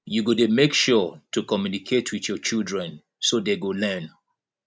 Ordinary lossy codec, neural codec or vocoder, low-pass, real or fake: none; none; none; real